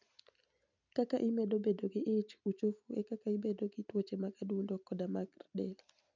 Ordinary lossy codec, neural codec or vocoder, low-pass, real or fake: none; none; 7.2 kHz; real